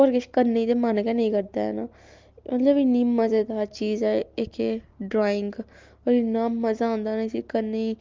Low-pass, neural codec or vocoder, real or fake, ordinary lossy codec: 7.2 kHz; none; real; Opus, 32 kbps